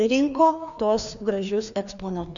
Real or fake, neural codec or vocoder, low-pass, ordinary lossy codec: fake; codec, 16 kHz, 2 kbps, FreqCodec, larger model; 7.2 kHz; MP3, 96 kbps